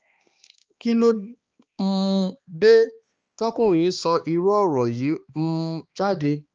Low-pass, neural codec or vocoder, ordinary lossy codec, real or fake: 7.2 kHz; codec, 16 kHz, 2 kbps, X-Codec, HuBERT features, trained on balanced general audio; Opus, 24 kbps; fake